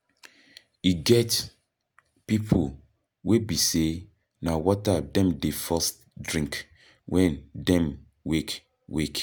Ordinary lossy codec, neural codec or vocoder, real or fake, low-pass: none; none; real; none